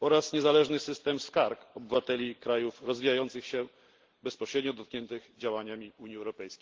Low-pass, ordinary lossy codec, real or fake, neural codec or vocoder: 7.2 kHz; Opus, 16 kbps; real; none